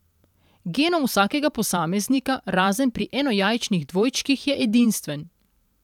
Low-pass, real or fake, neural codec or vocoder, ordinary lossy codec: 19.8 kHz; fake; vocoder, 44.1 kHz, 128 mel bands every 512 samples, BigVGAN v2; none